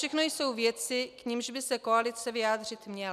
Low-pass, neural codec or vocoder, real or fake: 14.4 kHz; none; real